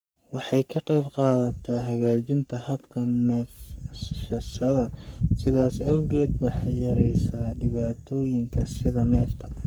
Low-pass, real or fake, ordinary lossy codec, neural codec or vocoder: none; fake; none; codec, 44.1 kHz, 3.4 kbps, Pupu-Codec